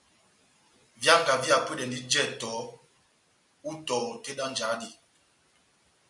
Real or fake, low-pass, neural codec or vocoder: real; 10.8 kHz; none